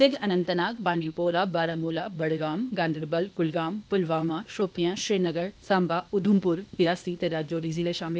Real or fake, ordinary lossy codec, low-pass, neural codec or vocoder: fake; none; none; codec, 16 kHz, 0.8 kbps, ZipCodec